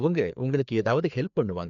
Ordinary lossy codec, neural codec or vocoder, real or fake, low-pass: none; codec, 16 kHz, 2 kbps, FreqCodec, larger model; fake; 7.2 kHz